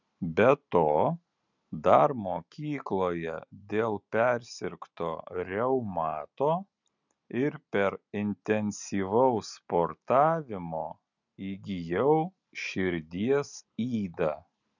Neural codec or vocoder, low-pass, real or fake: none; 7.2 kHz; real